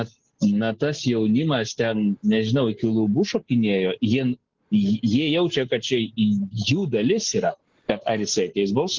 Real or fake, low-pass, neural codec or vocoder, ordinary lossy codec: real; 7.2 kHz; none; Opus, 16 kbps